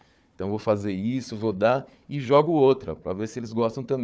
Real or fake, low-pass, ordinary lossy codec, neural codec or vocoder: fake; none; none; codec, 16 kHz, 4 kbps, FunCodec, trained on Chinese and English, 50 frames a second